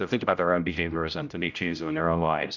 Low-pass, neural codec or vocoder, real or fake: 7.2 kHz; codec, 16 kHz, 0.5 kbps, X-Codec, HuBERT features, trained on general audio; fake